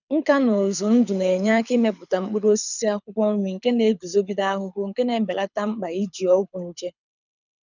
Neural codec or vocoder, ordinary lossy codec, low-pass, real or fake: codec, 24 kHz, 6 kbps, HILCodec; none; 7.2 kHz; fake